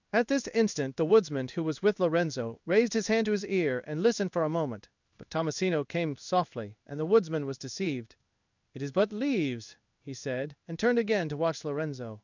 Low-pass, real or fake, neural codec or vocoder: 7.2 kHz; fake; codec, 16 kHz in and 24 kHz out, 1 kbps, XY-Tokenizer